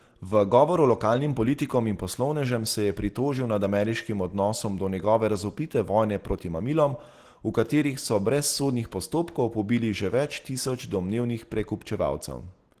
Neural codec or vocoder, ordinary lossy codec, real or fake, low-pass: none; Opus, 16 kbps; real; 14.4 kHz